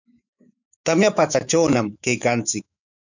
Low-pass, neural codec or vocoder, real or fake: 7.2 kHz; autoencoder, 48 kHz, 128 numbers a frame, DAC-VAE, trained on Japanese speech; fake